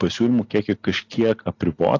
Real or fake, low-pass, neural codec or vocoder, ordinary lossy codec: real; 7.2 kHz; none; AAC, 48 kbps